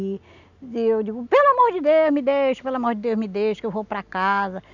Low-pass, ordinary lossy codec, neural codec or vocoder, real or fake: 7.2 kHz; none; none; real